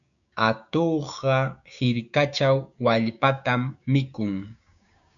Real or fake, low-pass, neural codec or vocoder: fake; 7.2 kHz; codec, 16 kHz, 6 kbps, DAC